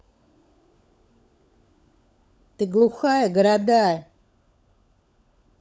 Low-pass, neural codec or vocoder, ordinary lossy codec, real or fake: none; codec, 16 kHz, 16 kbps, FunCodec, trained on LibriTTS, 50 frames a second; none; fake